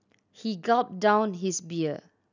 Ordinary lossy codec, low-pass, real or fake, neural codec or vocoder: none; 7.2 kHz; real; none